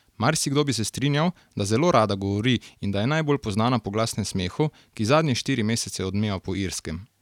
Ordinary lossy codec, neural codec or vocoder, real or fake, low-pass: none; none; real; 19.8 kHz